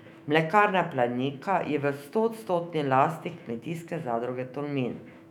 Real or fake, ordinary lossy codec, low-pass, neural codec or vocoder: fake; none; 19.8 kHz; autoencoder, 48 kHz, 128 numbers a frame, DAC-VAE, trained on Japanese speech